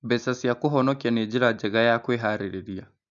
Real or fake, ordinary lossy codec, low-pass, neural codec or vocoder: real; none; 7.2 kHz; none